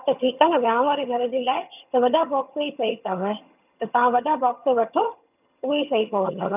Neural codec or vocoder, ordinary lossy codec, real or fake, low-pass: vocoder, 22.05 kHz, 80 mel bands, HiFi-GAN; none; fake; 3.6 kHz